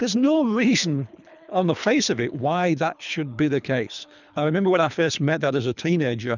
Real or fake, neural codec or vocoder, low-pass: fake; codec, 24 kHz, 3 kbps, HILCodec; 7.2 kHz